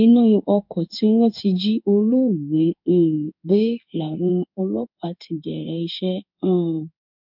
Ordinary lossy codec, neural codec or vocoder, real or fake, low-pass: none; codec, 16 kHz in and 24 kHz out, 1 kbps, XY-Tokenizer; fake; 5.4 kHz